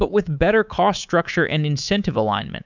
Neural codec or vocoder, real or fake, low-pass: codec, 24 kHz, 3.1 kbps, DualCodec; fake; 7.2 kHz